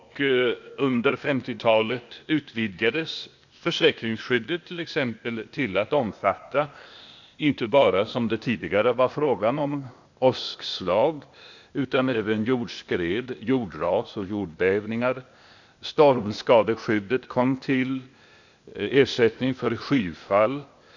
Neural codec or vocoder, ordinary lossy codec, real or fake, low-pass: codec, 16 kHz, 0.8 kbps, ZipCodec; none; fake; 7.2 kHz